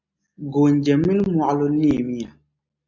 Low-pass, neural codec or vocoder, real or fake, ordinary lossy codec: 7.2 kHz; none; real; AAC, 48 kbps